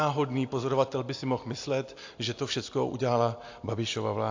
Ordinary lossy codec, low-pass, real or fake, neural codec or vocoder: AAC, 48 kbps; 7.2 kHz; real; none